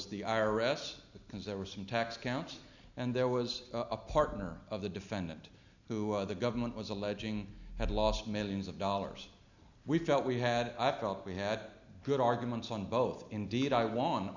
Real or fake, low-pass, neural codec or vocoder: real; 7.2 kHz; none